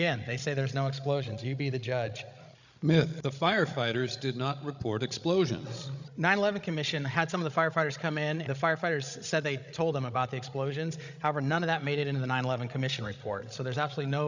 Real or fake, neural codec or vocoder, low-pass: fake; codec, 16 kHz, 16 kbps, FreqCodec, larger model; 7.2 kHz